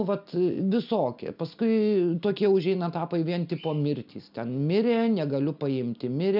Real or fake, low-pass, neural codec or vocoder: real; 5.4 kHz; none